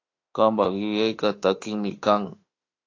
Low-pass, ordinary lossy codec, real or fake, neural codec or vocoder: 7.2 kHz; AAC, 48 kbps; fake; autoencoder, 48 kHz, 32 numbers a frame, DAC-VAE, trained on Japanese speech